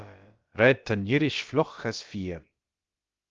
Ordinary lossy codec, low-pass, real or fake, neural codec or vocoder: Opus, 32 kbps; 7.2 kHz; fake; codec, 16 kHz, about 1 kbps, DyCAST, with the encoder's durations